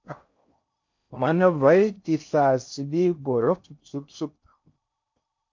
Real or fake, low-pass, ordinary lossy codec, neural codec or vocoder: fake; 7.2 kHz; MP3, 48 kbps; codec, 16 kHz in and 24 kHz out, 0.6 kbps, FocalCodec, streaming, 4096 codes